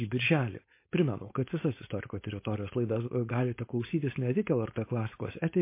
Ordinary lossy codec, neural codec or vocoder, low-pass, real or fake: MP3, 24 kbps; codec, 16 kHz, 4.8 kbps, FACodec; 3.6 kHz; fake